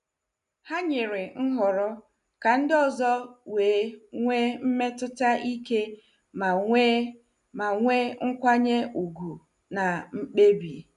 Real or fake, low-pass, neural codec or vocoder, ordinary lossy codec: real; 10.8 kHz; none; none